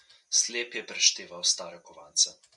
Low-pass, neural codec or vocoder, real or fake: 10.8 kHz; none; real